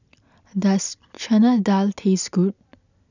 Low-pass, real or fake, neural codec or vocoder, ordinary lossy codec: 7.2 kHz; real; none; none